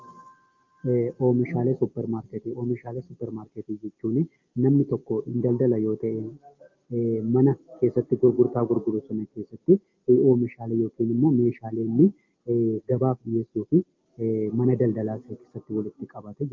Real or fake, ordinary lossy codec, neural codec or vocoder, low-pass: real; Opus, 32 kbps; none; 7.2 kHz